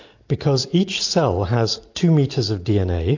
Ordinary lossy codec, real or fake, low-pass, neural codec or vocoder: AAC, 48 kbps; real; 7.2 kHz; none